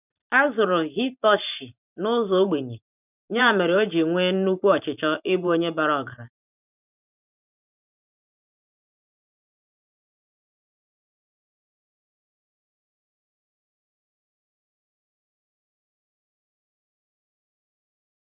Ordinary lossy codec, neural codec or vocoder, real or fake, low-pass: none; vocoder, 44.1 kHz, 128 mel bands every 512 samples, BigVGAN v2; fake; 3.6 kHz